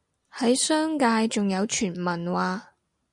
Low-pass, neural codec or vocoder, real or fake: 10.8 kHz; none; real